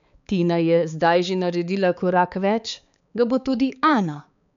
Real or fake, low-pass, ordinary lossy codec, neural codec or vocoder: fake; 7.2 kHz; MP3, 64 kbps; codec, 16 kHz, 4 kbps, X-Codec, HuBERT features, trained on balanced general audio